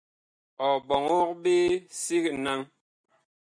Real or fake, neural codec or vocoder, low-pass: real; none; 9.9 kHz